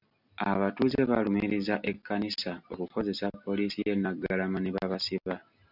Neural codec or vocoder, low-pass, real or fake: none; 5.4 kHz; real